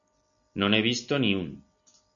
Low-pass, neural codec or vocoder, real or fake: 7.2 kHz; none; real